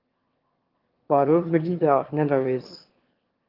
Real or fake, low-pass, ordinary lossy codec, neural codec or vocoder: fake; 5.4 kHz; Opus, 16 kbps; autoencoder, 22.05 kHz, a latent of 192 numbers a frame, VITS, trained on one speaker